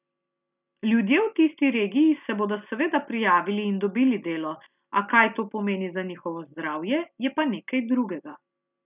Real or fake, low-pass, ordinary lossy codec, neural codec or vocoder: real; 3.6 kHz; none; none